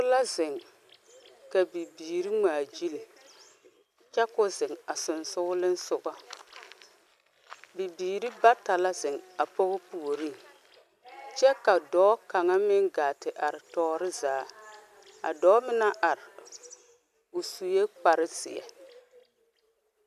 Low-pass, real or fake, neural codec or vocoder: 14.4 kHz; real; none